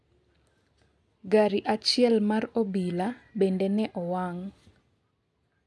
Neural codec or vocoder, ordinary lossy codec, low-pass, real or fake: none; none; none; real